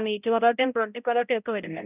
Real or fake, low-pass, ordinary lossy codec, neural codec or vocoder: fake; 3.6 kHz; none; codec, 16 kHz, 0.5 kbps, X-Codec, HuBERT features, trained on balanced general audio